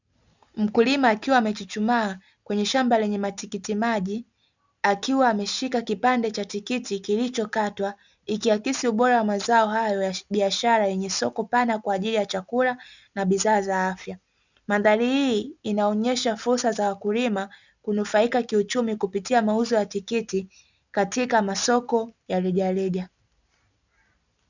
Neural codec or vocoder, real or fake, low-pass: none; real; 7.2 kHz